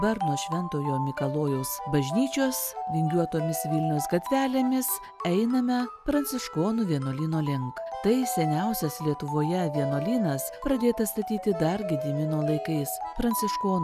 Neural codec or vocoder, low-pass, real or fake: none; 14.4 kHz; real